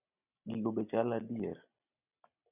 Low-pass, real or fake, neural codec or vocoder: 3.6 kHz; real; none